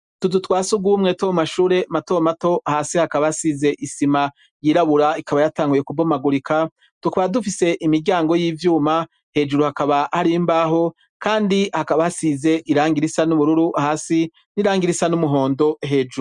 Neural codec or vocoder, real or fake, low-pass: none; real; 10.8 kHz